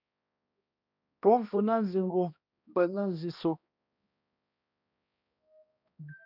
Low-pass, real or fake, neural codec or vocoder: 5.4 kHz; fake; codec, 16 kHz, 1 kbps, X-Codec, HuBERT features, trained on balanced general audio